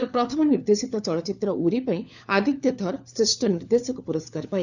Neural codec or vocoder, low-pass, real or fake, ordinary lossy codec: codec, 16 kHz, 4 kbps, FunCodec, trained on LibriTTS, 50 frames a second; 7.2 kHz; fake; none